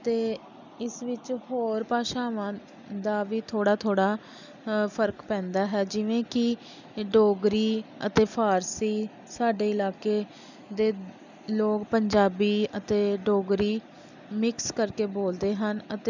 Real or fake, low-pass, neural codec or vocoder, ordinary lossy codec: real; 7.2 kHz; none; none